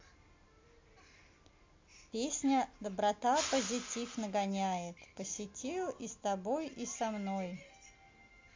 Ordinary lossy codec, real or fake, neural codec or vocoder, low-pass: MP3, 48 kbps; real; none; 7.2 kHz